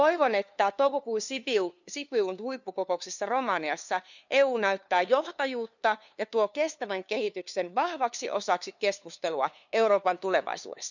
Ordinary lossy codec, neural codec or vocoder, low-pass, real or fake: none; codec, 16 kHz, 2 kbps, FunCodec, trained on LibriTTS, 25 frames a second; 7.2 kHz; fake